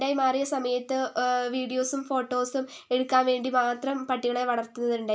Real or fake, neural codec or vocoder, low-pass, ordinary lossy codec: real; none; none; none